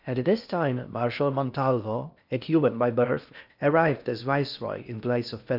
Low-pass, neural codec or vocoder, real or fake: 5.4 kHz; codec, 16 kHz in and 24 kHz out, 0.6 kbps, FocalCodec, streaming, 2048 codes; fake